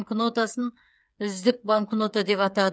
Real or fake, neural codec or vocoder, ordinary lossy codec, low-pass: fake; codec, 16 kHz, 8 kbps, FreqCodec, smaller model; none; none